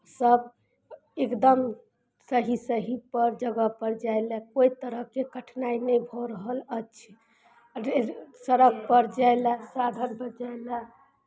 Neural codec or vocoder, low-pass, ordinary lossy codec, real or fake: none; none; none; real